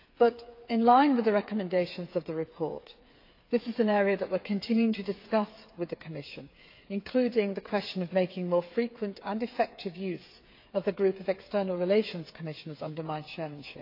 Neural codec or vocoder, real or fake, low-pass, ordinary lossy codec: codec, 16 kHz, 8 kbps, FreqCodec, smaller model; fake; 5.4 kHz; AAC, 32 kbps